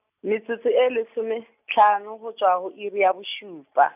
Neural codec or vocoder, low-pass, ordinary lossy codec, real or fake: none; 3.6 kHz; none; real